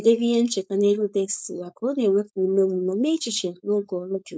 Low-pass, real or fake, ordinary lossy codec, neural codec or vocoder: none; fake; none; codec, 16 kHz, 4.8 kbps, FACodec